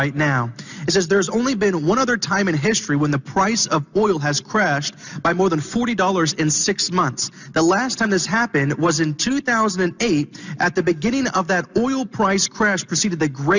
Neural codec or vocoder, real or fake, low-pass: none; real; 7.2 kHz